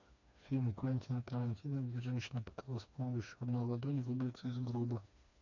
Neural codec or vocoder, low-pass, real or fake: codec, 16 kHz, 2 kbps, FreqCodec, smaller model; 7.2 kHz; fake